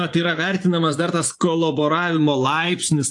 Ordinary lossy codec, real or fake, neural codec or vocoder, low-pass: AAC, 64 kbps; fake; vocoder, 24 kHz, 100 mel bands, Vocos; 10.8 kHz